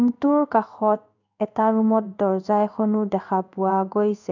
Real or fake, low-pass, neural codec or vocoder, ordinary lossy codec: fake; 7.2 kHz; codec, 16 kHz in and 24 kHz out, 1 kbps, XY-Tokenizer; none